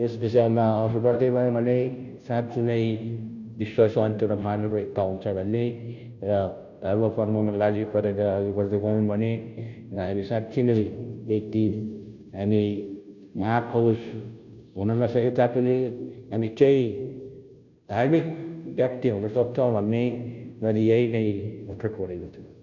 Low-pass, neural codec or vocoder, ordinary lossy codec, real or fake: 7.2 kHz; codec, 16 kHz, 0.5 kbps, FunCodec, trained on Chinese and English, 25 frames a second; none; fake